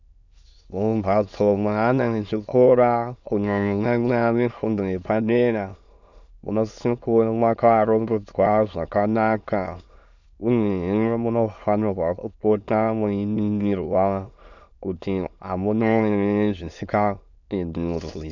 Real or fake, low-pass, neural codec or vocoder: fake; 7.2 kHz; autoencoder, 22.05 kHz, a latent of 192 numbers a frame, VITS, trained on many speakers